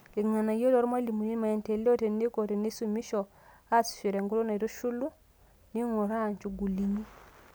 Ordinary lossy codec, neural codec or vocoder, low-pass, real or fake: none; none; none; real